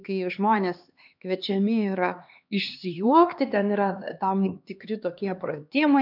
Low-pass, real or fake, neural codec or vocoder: 5.4 kHz; fake; codec, 16 kHz, 2 kbps, X-Codec, HuBERT features, trained on LibriSpeech